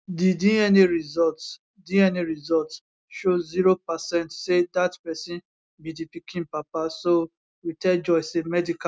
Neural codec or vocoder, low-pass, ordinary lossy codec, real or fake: none; none; none; real